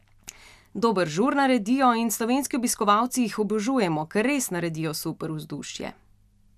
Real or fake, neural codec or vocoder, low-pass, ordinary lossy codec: real; none; 14.4 kHz; none